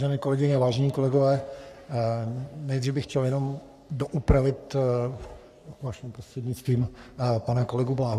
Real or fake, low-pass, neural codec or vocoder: fake; 14.4 kHz; codec, 44.1 kHz, 3.4 kbps, Pupu-Codec